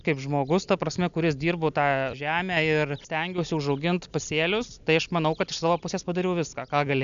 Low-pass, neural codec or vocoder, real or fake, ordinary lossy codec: 7.2 kHz; none; real; AAC, 96 kbps